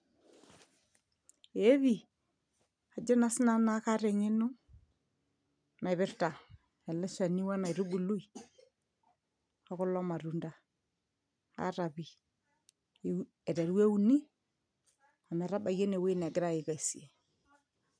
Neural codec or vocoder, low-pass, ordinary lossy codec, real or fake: none; 9.9 kHz; none; real